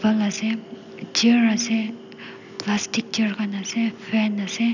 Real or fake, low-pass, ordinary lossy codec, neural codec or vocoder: real; 7.2 kHz; none; none